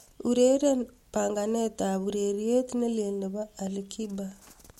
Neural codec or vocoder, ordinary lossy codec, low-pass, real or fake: none; MP3, 64 kbps; 19.8 kHz; real